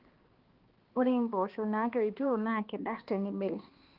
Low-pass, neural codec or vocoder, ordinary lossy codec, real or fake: 5.4 kHz; codec, 16 kHz, 2 kbps, X-Codec, HuBERT features, trained on balanced general audio; Opus, 16 kbps; fake